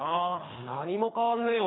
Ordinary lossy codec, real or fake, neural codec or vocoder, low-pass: AAC, 16 kbps; fake; codec, 24 kHz, 3 kbps, HILCodec; 7.2 kHz